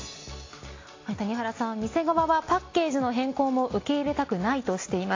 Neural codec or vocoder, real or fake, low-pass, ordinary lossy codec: none; real; 7.2 kHz; AAC, 32 kbps